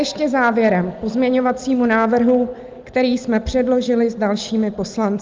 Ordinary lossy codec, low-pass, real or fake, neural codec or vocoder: Opus, 32 kbps; 7.2 kHz; real; none